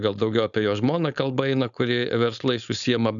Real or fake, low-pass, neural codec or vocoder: fake; 7.2 kHz; codec, 16 kHz, 4.8 kbps, FACodec